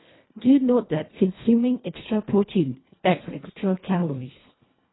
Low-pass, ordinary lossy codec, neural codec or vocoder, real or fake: 7.2 kHz; AAC, 16 kbps; codec, 24 kHz, 1.5 kbps, HILCodec; fake